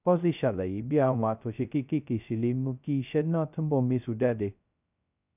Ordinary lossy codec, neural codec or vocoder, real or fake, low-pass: none; codec, 16 kHz, 0.2 kbps, FocalCodec; fake; 3.6 kHz